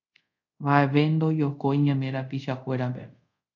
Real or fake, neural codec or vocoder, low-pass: fake; codec, 24 kHz, 0.5 kbps, DualCodec; 7.2 kHz